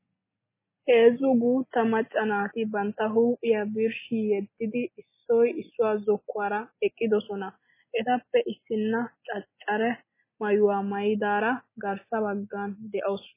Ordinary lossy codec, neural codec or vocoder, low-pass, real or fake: MP3, 16 kbps; none; 3.6 kHz; real